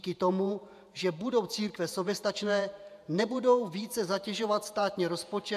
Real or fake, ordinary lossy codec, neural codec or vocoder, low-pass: fake; AAC, 96 kbps; vocoder, 48 kHz, 128 mel bands, Vocos; 14.4 kHz